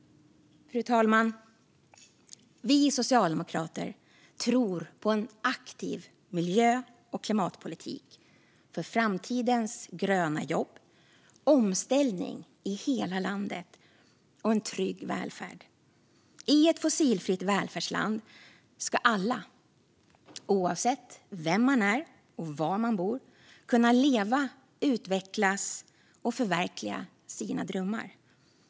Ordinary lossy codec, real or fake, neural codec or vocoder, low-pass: none; real; none; none